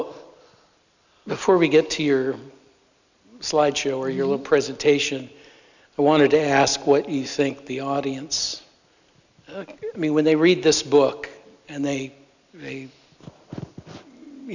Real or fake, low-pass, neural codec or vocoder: real; 7.2 kHz; none